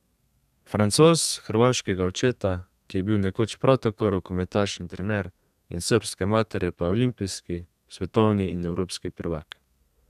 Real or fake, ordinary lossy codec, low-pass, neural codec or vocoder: fake; none; 14.4 kHz; codec, 32 kHz, 1.9 kbps, SNAC